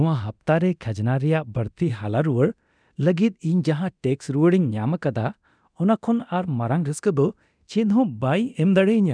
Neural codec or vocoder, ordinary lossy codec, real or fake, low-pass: codec, 24 kHz, 0.9 kbps, DualCodec; none; fake; 9.9 kHz